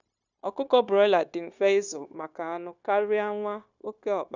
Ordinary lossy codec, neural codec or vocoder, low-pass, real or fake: none; codec, 16 kHz, 0.9 kbps, LongCat-Audio-Codec; 7.2 kHz; fake